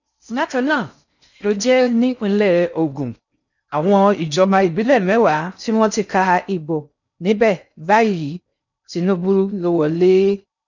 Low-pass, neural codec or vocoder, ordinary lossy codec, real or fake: 7.2 kHz; codec, 16 kHz in and 24 kHz out, 0.6 kbps, FocalCodec, streaming, 4096 codes; none; fake